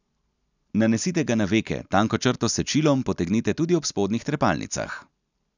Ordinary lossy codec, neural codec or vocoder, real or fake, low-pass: none; none; real; 7.2 kHz